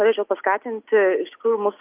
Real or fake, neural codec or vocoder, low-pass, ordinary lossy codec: real; none; 3.6 kHz; Opus, 32 kbps